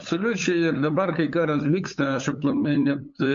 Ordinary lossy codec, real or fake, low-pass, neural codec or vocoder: MP3, 48 kbps; fake; 7.2 kHz; codec, 16 kHz, 8 kbps, FunCodec, trained on LibriTTS, 25 frames a second